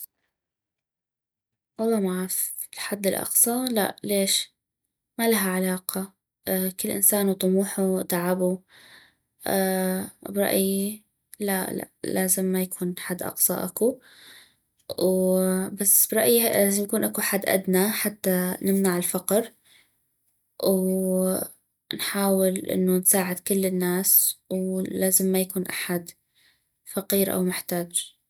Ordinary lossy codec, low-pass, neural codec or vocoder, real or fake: none; none; none; real